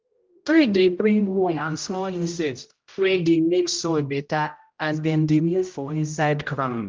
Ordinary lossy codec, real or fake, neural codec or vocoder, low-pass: Opus, 32 kbps; fake; codec, 16 kHz, 0.5 kbps, X-Codec, HuBERT features, trained on general audio; 7.2 kHz